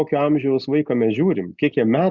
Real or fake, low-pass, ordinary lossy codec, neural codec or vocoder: real; 7.2 kHz; Opus, 64 kbps; none